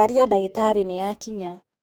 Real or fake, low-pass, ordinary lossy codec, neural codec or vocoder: fake; none; none; codec, 44.1 kHz, 2.6 kbps, DAC